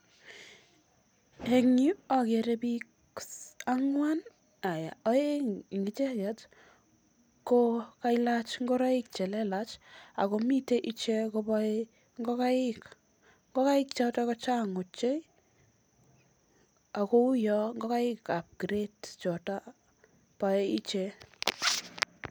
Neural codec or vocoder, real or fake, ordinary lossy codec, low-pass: none; real; none; none